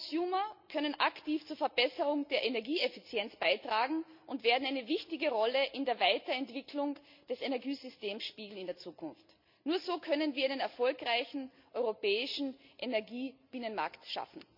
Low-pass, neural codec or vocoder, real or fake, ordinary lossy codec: 5.4 kHz; none; real; MP3, 48 kbps